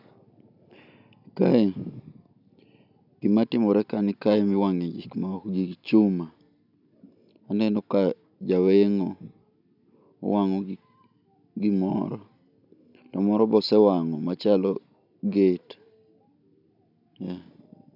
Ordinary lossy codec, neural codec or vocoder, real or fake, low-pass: none; none; real; 5.4 kHz